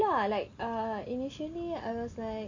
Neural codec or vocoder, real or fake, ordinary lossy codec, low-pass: none; real; MP3, 48 kbps; 7.2 kHz